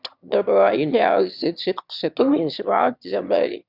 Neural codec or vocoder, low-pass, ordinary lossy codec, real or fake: autoencoder, 22.05 kHz, a latent of 192 numbers a frame, VITS, trained on one speaker; 5.4 kHz; Opus, 64 kbps; fake